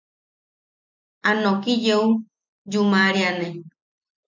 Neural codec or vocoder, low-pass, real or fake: none; 7.2 kHz; real